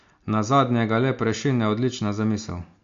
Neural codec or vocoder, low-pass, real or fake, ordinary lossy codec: none; 7.2 kHz; real; MP3, 48 kbps